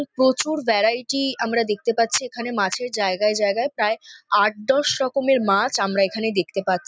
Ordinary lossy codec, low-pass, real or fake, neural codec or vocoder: none; none; real; none